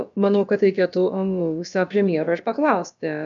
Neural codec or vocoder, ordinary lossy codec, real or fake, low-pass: codec, 16 kHz, about 1 kbps, DyCAST, with the encoder's durations; MP3, 96 kbps; fake; 7.2 kHz